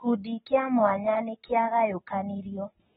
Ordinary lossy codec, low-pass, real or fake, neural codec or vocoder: AAC, 16 kbps; 19.8 kHz; real; none